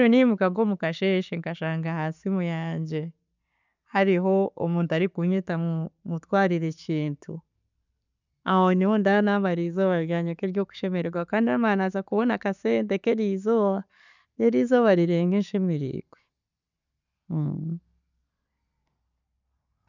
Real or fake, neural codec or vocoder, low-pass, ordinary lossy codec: real; none; 7.2 kHz; none